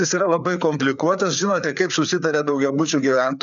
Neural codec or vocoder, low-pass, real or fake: codec, 16 kHz, 4 kbps, FreqCodec, larger model; 7.2 kHz; fake